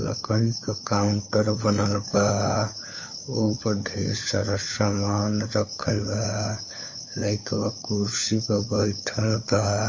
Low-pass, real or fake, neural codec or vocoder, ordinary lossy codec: 7.2 kHz; fake; codec, 16 kHz, 4 kbps, FunCodec, trained on LibriTTS, 50 frames a second; MP3, 32 kbps